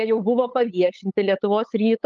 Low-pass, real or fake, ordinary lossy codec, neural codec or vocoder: 7.2 kHz; fake; Opus, 24 kbps; codec, 16 kHz, 8 kbps, FunCodec, trained on LibriTTS, 25 frames a second